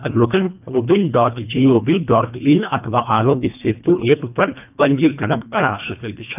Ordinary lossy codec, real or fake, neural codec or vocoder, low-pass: none; fake; codec, 24 kHz, 1.5 kbps, HILCodec; 3.6 kHz